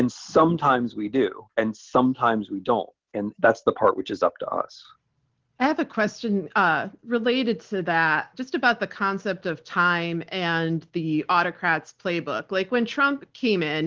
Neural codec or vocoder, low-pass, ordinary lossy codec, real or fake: none; 7.2 kHz; Opus, 16 kbps; real